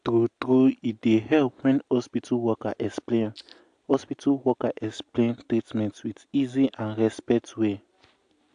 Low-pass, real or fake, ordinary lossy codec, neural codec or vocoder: 9.9 kHz; real; AAC, 64 kbps; none